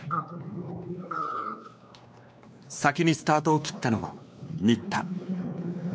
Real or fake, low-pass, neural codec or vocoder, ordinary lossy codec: fake; none; codec, 16 kHz, 2 kbps, X-Codec, WavLM features, trained on Multilingual LibriSpeech; none